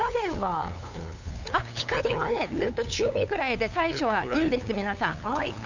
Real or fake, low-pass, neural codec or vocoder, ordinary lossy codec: fake; 7.2 kHz; codec, 16 kHz, 8 kbps, FunCodec, trained on LibriTTS, 25 frames a second; none